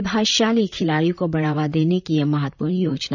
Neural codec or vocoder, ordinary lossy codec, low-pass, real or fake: vocoder, 44.1 kHz, 128 mel bands, Pupu-Vocoder; none; 7.2 kHz; fake